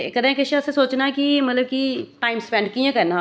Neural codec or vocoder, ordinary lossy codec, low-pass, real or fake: none; none; none; real